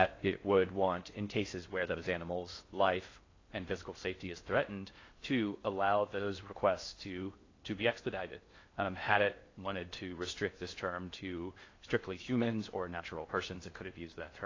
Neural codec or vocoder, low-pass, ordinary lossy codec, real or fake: codec, 16 kHz in and 24 kHz out, 0.6 kbps, FocalCodec, streaming, 4096 codes; 7.2 kHz; AAC, 32 kbps; fake